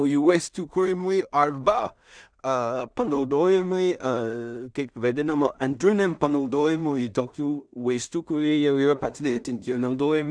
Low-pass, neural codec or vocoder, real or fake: 9.9 kHz; codec, 16 kHz in and 24 kHz out, 0.4 kbps, LongCat-Audio-Codec, two codebook decoder; fake